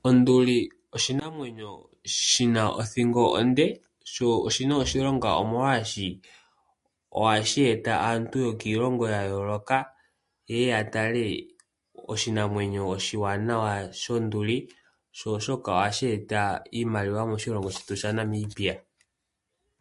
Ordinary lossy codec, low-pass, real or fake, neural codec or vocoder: MP3, 48 kbps; 14.4 kHz; real; none